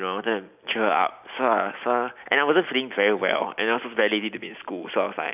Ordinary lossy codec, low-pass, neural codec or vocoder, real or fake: none; 3.6 kHz; none; real